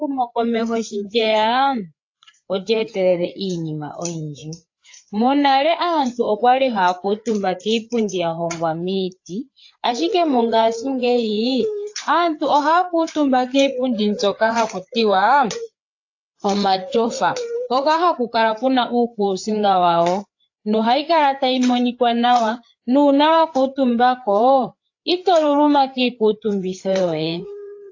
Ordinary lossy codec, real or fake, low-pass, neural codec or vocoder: AAC, 48 kbps; fake; 7.2 kHz; codec, 16 kHz, 4 kbps, FreqCodec, larger model